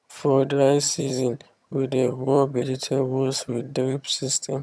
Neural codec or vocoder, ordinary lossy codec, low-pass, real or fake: vocoder, 22.05 kHz, 80 mel bands, HiFi-GAN; none; none; fake